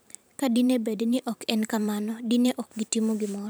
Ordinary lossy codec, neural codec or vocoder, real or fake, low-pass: none; vocoder, 44.1 kHz, 128 mel bands every 512 samples, BigVGAN v2; fake; none